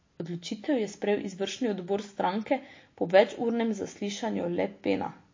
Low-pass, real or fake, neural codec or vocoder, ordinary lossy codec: 7.2 kHz; real; none; MP3, 32 kbps